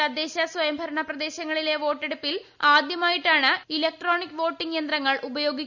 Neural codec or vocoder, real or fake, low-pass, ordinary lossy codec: none; real; 7.2 kHz; none